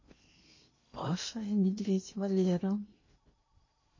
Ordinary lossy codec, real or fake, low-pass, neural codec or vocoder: MP3, 32 kbps; fake; 7.2 kHz; codec, 16 kHz in and 24 kHz out, 0.8 kbps, FocalCodec, streaming, 65536 codes